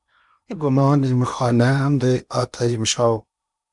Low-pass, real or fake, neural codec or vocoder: 10.8 kHz; fake; codec, 16 kHz in and 24 kHz out, 0.8 kbps, FocalCodec, streaming, 65536 codes